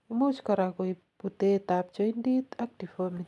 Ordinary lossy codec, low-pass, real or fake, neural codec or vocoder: none; none; real; none